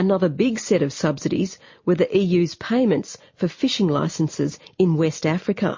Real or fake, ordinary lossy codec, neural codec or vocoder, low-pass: real; MP3, 32 kbps; none; 7.2 kHz